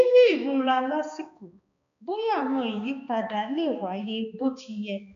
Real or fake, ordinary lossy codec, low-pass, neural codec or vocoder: fake; none; 7.2 kHz; codec, 16 kHz, 2 kbps, X-Codec, HuBERT features, trained on balanced general audio